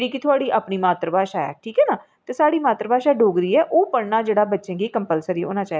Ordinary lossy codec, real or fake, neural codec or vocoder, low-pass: none; real; none; none